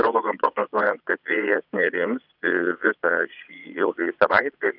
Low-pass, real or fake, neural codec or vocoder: 5.4 kHz; fake; vocoder, 22.05 kHz, 80 mel bands, Vocos